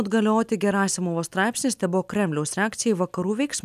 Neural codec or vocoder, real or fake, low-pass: none; real; 14.4 kHz